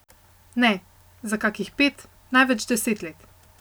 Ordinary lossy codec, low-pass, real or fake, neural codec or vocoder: none; none; real; none